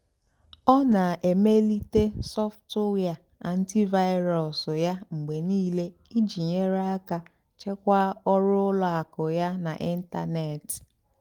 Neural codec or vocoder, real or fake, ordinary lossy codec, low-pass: none; real; Opus, 24 kbps; 19.8 kHz